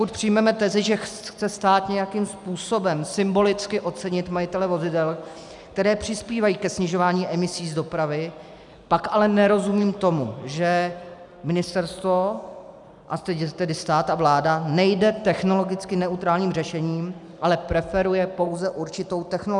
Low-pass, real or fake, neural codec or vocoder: 10.8 kHz; real; none